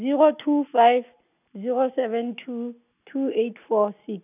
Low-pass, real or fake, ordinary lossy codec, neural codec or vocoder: 3.6 kHz; real; none; none